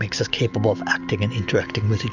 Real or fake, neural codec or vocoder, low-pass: real; none; 7.2 kHz